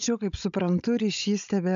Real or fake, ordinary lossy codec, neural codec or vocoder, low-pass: fake; MP3, 64 kbps; codec, 16 kHz, 16 kbps, FunCodec, trained on Chinese and English, 50 frames a second; 7.2 kHz